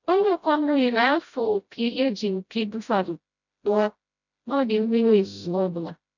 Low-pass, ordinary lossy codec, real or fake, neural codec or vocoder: 7.2 kHz; none; fake; codec, 16 kHz, 0.5 kbps, FreqCodec, smaller model